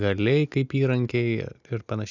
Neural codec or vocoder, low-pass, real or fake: none; 7.2 kHz; real